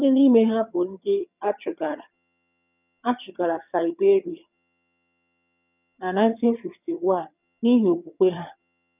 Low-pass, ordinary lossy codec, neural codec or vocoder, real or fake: 3.6 kHz; none; vocoder, 22.05 kHz, 80 mel bands, HiFi-GAN; fake